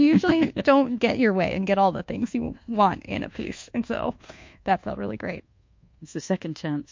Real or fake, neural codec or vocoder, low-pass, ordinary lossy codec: fake; codec, 24 kHz, 1.2 kbps, DualCodec; 7.2 kHz; MP3, 48 kbps